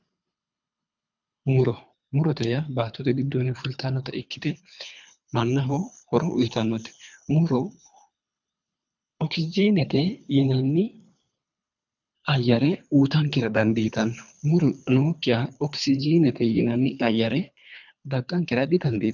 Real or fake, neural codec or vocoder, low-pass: fake; codec, 24 kHz, 3 kbps, HILCodec; 7.2 kHz